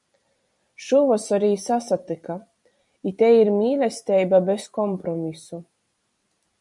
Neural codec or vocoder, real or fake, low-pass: vocoder, 44.1 kHz, 128 mel bands every 256 samples, BigVGAN v2; fake; 10.8 kHz